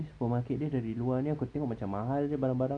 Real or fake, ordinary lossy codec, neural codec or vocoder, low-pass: real; none; none; 9.9 kHz